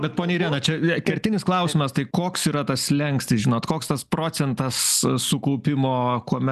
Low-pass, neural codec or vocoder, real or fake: 14.4 kHz; none; real